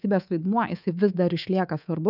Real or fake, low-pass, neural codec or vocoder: fake; 5.4 kHz; codec, 24 kHz, 3.1 kbps, DualCodec